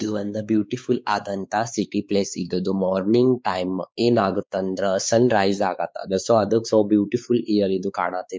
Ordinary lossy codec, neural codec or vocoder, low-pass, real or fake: none; codec, 16 kHz, 4 kbps, X-Codec, WavLM features, trained on Multilingual LibriSpeech; none; fake